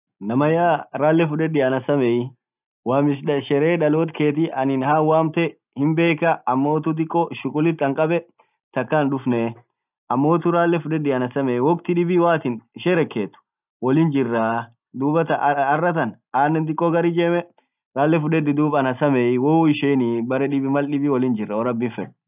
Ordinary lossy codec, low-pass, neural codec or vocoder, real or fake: none; 3.6 kHz; none; real